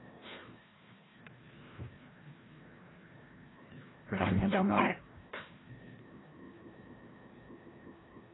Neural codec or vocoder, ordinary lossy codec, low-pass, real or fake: codec, 16 kHz, 1 kbps, FreqCodec, larger model; AAC, 16 kbps; 7.2 kHz; fake